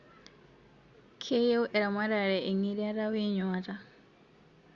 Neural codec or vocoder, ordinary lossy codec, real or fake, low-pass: none; Opus, 64 kbps; real; 7.2 kHz